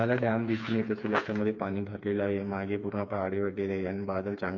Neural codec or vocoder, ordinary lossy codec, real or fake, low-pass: codec, 16 kHz, 4 kbps, FreqCodec, smaller model; MP3, 48 kbps; fake; 7.2 kHz